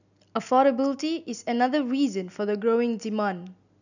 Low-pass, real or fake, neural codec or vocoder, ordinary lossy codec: 7.2 kHz; real; none; none